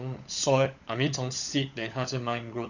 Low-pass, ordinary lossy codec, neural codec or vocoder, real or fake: 7.2 kHz; none; codec, 16 kHz, 16 kbps, FunCodec, trained on LibriTTS, 50 frames a second; fake